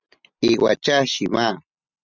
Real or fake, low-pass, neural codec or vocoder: real; 7.2 kHz; none